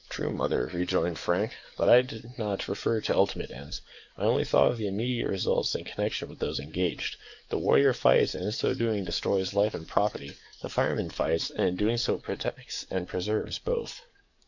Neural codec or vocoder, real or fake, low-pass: codec, 44.1 kHz, 7.8 kbps, DAC; fake; 7.2 kHz